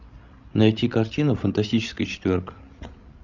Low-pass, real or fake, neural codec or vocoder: 7.2 kHz; fake; codec, 16 kHz, 16 kbps, FreqCodec, larger model